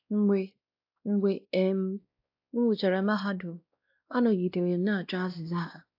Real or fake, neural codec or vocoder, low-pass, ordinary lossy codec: fake; codec, 16 kHz, 1 kbps, X-Codec, WavLM features, trained on Multilingual LibriSpeech; 5.4 kHz; AAC, 48 kbps